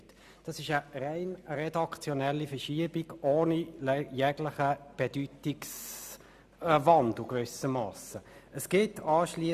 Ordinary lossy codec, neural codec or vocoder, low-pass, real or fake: Opus, 64 kbps; none; 14.4 kHz; real